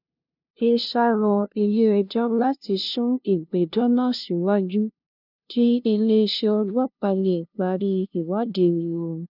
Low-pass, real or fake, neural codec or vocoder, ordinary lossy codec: 5.4 kHz; fake; codec, 16 kHz, 0.5 kbps, FunCodec, trained on LibriTTS, 25 frames a second; none